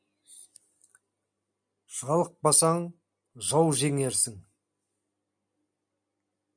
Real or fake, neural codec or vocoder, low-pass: real; none; 9.9 kHz